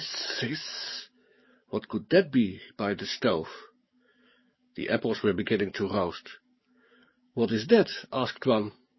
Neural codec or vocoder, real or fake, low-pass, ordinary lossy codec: codec, 44.1 kHz, 7.8 kbps, DAC; fake; 7.2 kHz; MP3, 24 kbps